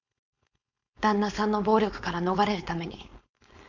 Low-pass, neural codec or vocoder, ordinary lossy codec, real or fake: 7.2 kHz; codec, 16 kHz, 4.8 kbps, FACodec; none; fake